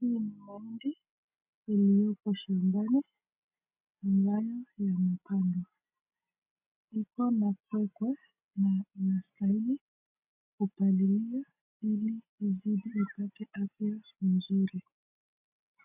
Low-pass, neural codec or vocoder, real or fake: 3.6 kHz; none; real